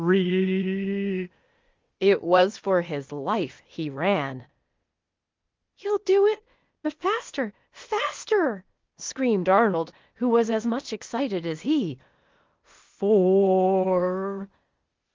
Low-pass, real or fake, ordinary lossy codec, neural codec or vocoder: 7.2 kHz; fake; Opus, 32 kbps; codec, 16 kHz, 0.8 kbps, ZipCodec